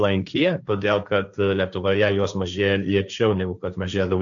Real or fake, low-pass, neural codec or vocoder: fake; 7.2 kHz; codec, 16 kHz, 1.1 kbps, Voila-Tokenizer